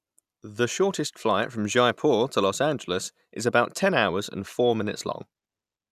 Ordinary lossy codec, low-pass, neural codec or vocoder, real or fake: none; 14.4 kHz; none; real